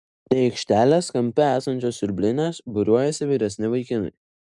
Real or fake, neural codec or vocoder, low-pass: real; none; 10.8 kHz